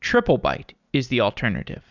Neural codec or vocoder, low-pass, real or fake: none; 7.2 kHz; real